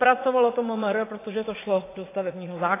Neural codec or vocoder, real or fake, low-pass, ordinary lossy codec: vocoder, 44.1 kHz, 80 mel bands, Vocos; fake; 3.6 kHz; AAC, 16 kbps